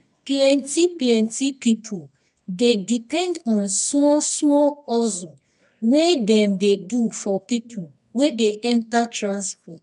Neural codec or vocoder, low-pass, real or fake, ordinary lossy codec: codec, 24 kHz, 0.9 kbps, WavTokenizer, medium music audio release; 10.8 kHz; fake; none